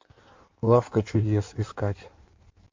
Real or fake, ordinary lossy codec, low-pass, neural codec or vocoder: fake; MP3, 48 kbps; 7.2 kHz; codec, 16 kHz in and 24 kHz out, 1.1 kbps, FireRedTTS-2 codec